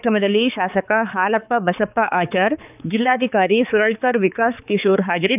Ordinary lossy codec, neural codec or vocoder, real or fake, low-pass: none; codec, 16 kHz, 4 kbps, X-Codec, HuBERT features, trained on balanced general audio; fake; 3.6 kHz